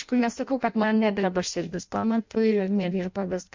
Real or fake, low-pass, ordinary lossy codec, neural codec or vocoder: fake; 7.2 kHz; MP3, 64 kbps; codec, 16 kHz in and 24 kHz out, 0.6 kbps, FireRedTTS-2 codec